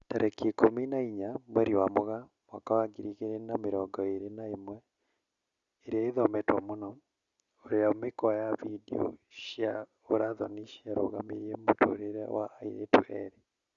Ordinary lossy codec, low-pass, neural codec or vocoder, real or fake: Opus, 64 kbps; 7.2 kHz; none; real